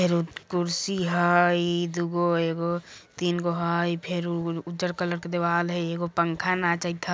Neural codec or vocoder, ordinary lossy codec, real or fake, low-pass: none; none; real; none